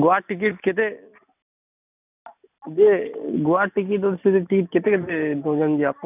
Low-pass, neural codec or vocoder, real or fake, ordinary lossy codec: 3.6 kHz; none; real; none